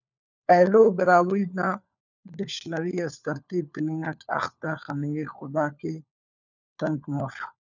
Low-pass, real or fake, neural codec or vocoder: 7.2 kHz; fake; codec, 16 kHz, 4 kbps, FunCodec, trained on LibriTTS, 50 frames a second